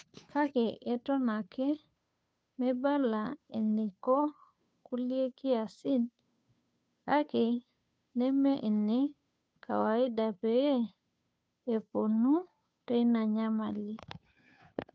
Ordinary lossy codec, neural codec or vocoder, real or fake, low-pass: none; codec, 16 kHz, 2 kbps, FunCodec, trained on Chinese and English, 25 frames a second; fake; none